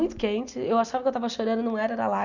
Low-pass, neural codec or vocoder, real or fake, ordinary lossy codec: 7.2 kHz; none; real; none